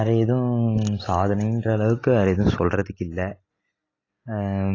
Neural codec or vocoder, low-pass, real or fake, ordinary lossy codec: none; 7.2 kHz; real; AAC, 32 kbps